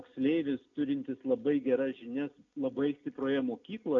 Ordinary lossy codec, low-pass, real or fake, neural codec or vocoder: AAC, 48 kbps; 7.2 kHz; real; none